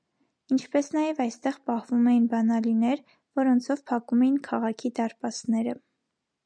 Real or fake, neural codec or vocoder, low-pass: real; none; 9.9 kHz